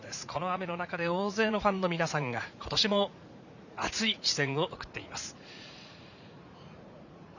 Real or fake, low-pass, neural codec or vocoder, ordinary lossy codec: fake; 7.2 kHz; vocoder, 44.1 kHz, 80 mel bands, Vocos; none